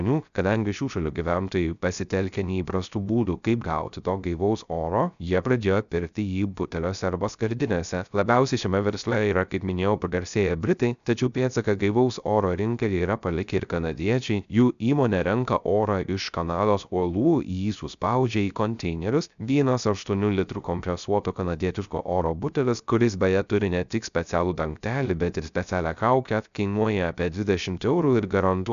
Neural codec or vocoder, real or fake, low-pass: codec, 16 kHz, 0.3 kbps, FocalCodec; fake; 7.2 kHz